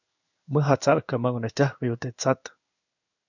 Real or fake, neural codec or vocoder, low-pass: fake; codec, 16 kHz in and 24 kHz out, 1 kbps, XY-Tokenizer; 7.2 kHz